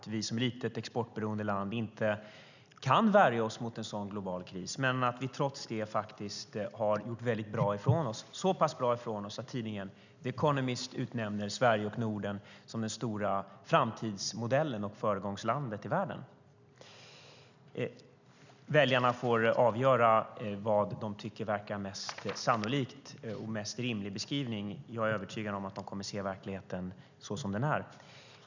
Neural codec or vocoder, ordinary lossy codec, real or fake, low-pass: none; none; real; 7.2 kHz